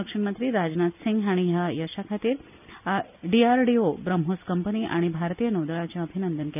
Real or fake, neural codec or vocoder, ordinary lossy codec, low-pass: real; none; none; 3.6 kHz